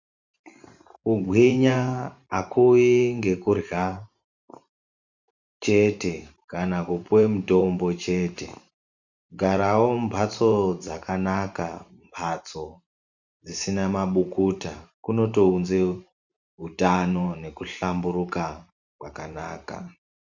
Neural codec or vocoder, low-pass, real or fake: vocoder, 44.1 kHz, 128 mel bands every 512 samples, BigVGAN v2; 7.2 kHz; fake